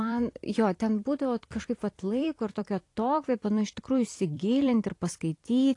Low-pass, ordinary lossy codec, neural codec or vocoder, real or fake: 10.8 kHz; AAC, 48 kbps; vocoder, 44.1 kHz, 128 mel bands every 256 samples, BigVGAN v2; fake